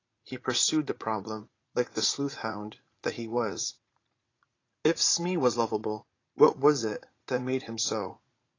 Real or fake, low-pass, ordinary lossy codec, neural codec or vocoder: fake; 7.2 kHz; AAC, 32 kbps; vocoder, 44.1 kHz, 80 mel bands, Vocos